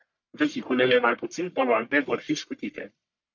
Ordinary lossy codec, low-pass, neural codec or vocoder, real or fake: MP3, 64 kbps; 7.2 kHz; codec, 44.1 kHz, 1.7 kbps, Pupu-Codec; fake